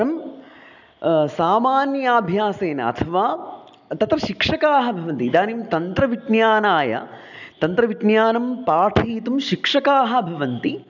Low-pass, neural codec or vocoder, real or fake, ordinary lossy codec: 7.2 kHz; none; real; none